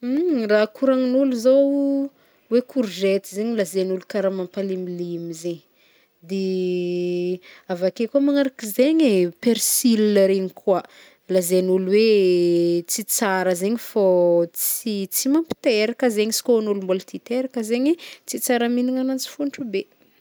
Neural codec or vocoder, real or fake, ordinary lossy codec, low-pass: none; real; none; none